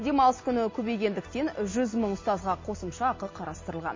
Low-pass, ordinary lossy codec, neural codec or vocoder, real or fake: 7.2 kHz; MP3, 32 kbps; none; real